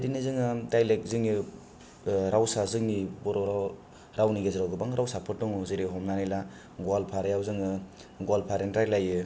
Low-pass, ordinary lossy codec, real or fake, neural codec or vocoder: none; none; real; none